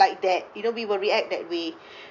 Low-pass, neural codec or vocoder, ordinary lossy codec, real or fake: 7.2 kHz; none; none; real